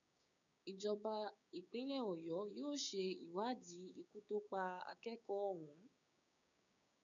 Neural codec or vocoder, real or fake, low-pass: codec, 16 kHz, 6 kbps, DAC; fake; 7.2 kHz